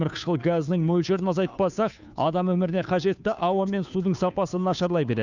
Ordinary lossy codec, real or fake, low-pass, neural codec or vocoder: none; fake; 7.2 kHz; codec, 16 kHz, 2 kbps, FunCodec, trained on Chinese and English, 25 frames a second